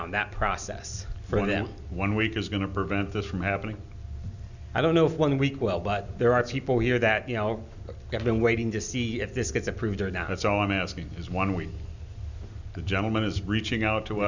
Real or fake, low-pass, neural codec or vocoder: real; 7.2 kHz; none